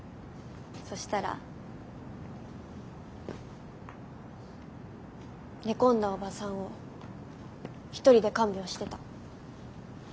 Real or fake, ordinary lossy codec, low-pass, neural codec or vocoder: real; none; none; none